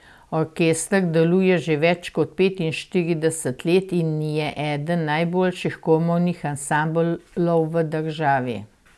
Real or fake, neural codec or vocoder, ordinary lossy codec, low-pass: real; none; none; none